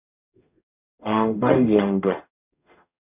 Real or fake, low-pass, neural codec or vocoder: fake; 3.6 kHz; codec, 44.1 kHz, 0.9 kbps, DAC